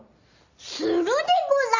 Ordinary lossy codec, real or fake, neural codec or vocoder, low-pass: Opus, 64 kbps; real; none; 7.2 kHz